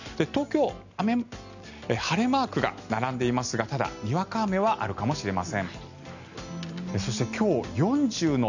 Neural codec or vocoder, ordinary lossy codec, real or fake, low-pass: none; none; real; 7.2 kHz